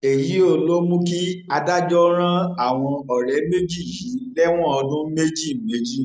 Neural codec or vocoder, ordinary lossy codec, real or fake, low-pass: none; none; real; none